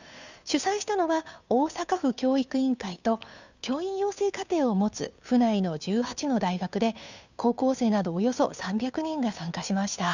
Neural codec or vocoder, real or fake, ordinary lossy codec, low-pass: codec, 16 kHz, 2 kbps, FunCodec, trained on Chinese and English, 25 frames a second; fake; none; 7.2 kHz